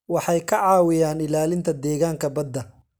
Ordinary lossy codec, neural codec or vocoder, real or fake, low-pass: none; none; real; none